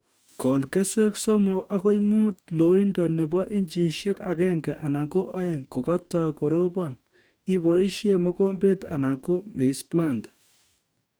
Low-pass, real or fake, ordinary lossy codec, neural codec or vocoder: none; fake; none; codec, 44.1 kHz, 2.6 kbps, DAC